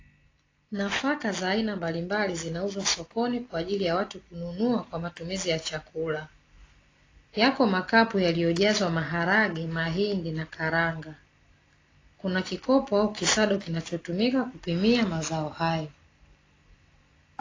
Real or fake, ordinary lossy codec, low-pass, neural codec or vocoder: real; AAC, 32 kbps; 7.2 kHz; none